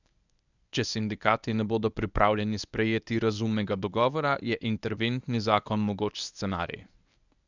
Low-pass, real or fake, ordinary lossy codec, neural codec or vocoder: 7.2 kHz; fake; none; codec, 24 kHz, 0.9 kbps, WavTokenizer, medium speech release version 1